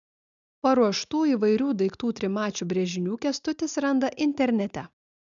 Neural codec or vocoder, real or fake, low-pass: none; real; 7.2 kHz